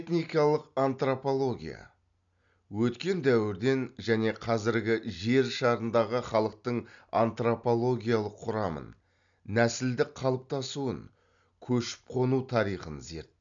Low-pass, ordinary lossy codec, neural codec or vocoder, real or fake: 7.2 kHz; none; none; real